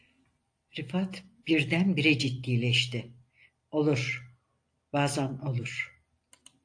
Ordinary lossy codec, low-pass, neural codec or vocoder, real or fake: AAC, 48 kbps; 9.9 kHz; none; real